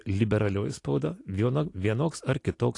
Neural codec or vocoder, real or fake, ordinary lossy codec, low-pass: none; real; AAC, 48 kbps; 10.8 kHz